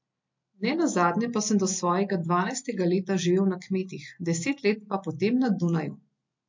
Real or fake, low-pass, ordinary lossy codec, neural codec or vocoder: real; 7.2 kHz; MP3, 48 kbps; none